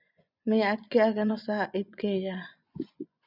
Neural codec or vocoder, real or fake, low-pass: vocoder, 24 kHz, 100 mel bands, Vocos; fake; 5.4 kHz